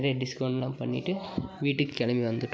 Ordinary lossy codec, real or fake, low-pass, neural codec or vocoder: none; real; none; none